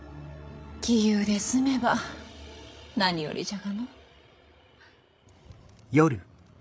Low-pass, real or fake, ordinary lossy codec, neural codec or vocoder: none; fake; none; codec, 16 kHz, 16 kbps, FreqCodec, larger model